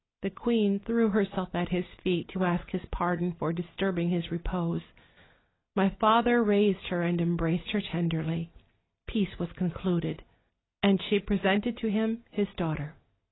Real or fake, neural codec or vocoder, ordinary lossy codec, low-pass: real; none; AAC, 16 kbps; 7.2 kHz